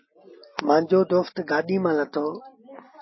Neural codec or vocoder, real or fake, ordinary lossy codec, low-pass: none; real; MP3, 24 kbps; 7.2 kHz